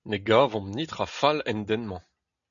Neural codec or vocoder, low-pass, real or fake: none; 7.2 kHz; real